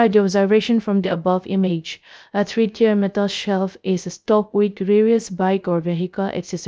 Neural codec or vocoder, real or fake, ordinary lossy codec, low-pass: codec, 16 kHz, 0.3 kbps, FocalCodec; fake; none; none